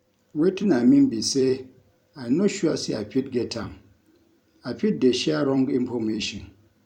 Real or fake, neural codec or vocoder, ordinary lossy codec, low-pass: fake; vocoder, 44.1 kHz, 128 mel bands every 256 samples, BigVGAN v2; none; 19.8 kHz